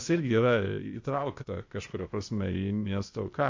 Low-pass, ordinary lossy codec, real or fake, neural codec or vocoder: 7.2 kHz; MP3, 48 kbps; fake; codec, 16 kHz, 0.8 kbps, ZipCodec